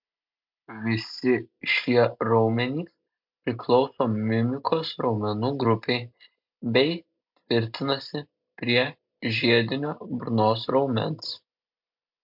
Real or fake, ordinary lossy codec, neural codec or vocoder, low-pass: real; MP3, 48 kbps; none; 5.4 kHz